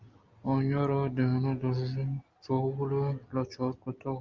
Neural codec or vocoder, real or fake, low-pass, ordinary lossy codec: none; real; 7.2 kHz; Opus, 32 kbps